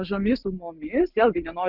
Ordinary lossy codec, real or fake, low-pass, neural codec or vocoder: Opus, 24 kbps; real; 5.4 kHz; none